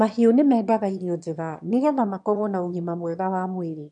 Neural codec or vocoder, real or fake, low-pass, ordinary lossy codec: autoencoder, 22.05 kHz, a latent of 192 numbers a frame, VITS, trained on one speaker; fake; 9.9 kHz; none